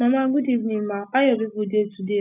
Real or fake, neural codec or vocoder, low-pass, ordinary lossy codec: real; none; 3.6 kHz; none